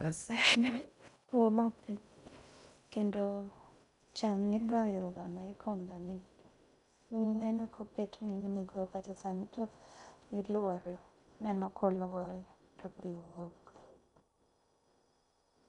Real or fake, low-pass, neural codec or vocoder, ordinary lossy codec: fake; 10.8 kHz; codec, 16 kHz in and 24 kHz out, 0.6 kbps, FocalCodec, streaming, 2048 codes; none